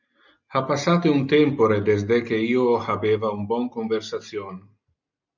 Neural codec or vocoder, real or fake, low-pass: none; real; 7.2 kHz